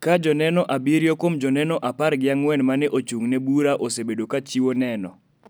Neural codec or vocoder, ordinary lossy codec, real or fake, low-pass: none; none; real; none